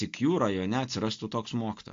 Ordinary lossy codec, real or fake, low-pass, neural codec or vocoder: AAC, 48 kbps; real; 7.2 kHz; none